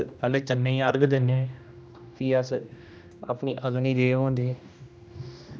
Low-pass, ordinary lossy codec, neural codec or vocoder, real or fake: none; none; codec, 16 kHz, 1 kbps, X-Codec, HuBERT features, trained on general audio; fake